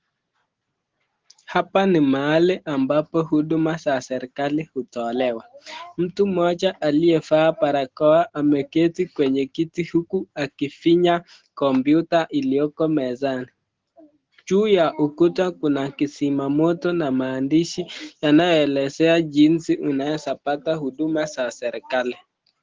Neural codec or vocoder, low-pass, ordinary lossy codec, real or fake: none; 7.2 kHz; Opus, 16 kbps; real